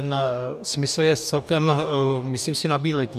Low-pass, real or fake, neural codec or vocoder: 14.4 kHz; fake; codec, 44.1 kHz, 2.6 kbps, DAC